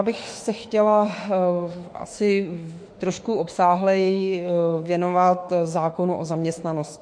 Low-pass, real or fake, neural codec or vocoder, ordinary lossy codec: 9.9 kHz; fake; autoencoder, 48 kHz, 32 numbers a frame, DAC-VAE, trained on Japanese speech; MP3, 48 kbps